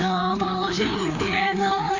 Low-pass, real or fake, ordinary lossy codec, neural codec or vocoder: 7.2 kHz; fake; AAC, 48 kbps; codec, 16 kHz, 2 kbps, FreqCodec, larger model